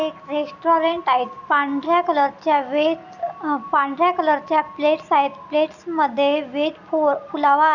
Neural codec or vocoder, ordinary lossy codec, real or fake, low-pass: none; none; real; 7.2 kHz